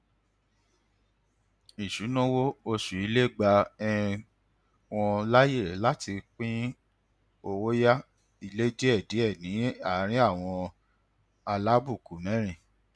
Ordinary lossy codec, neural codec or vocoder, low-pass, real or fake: none; none; none; real